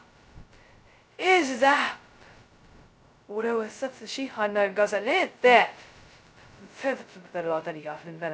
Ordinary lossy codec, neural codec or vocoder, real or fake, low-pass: none; codec, 16 kHz, 0.2 kbps, FocalCodec; fake; none